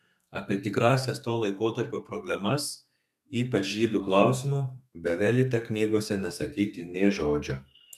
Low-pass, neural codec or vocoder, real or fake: 14.4 kHz; codec, 32 kHz, 1.9 kbps, SNAC; fake